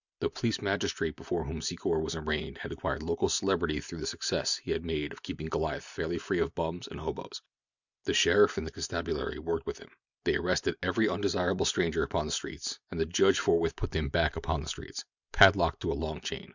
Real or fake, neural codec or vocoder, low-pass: real; none; 7.2 kHz